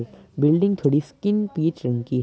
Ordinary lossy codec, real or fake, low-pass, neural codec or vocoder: none; real; none; none